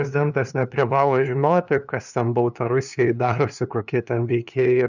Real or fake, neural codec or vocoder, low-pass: fake; codec, 16 kHz, 2 kbps, FunCodec, trained on LibriTTS, 25 frames a second; 7.2 kHz